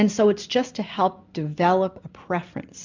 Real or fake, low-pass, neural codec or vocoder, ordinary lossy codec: real; 7.2 kHz; none; MP3, 64 kbps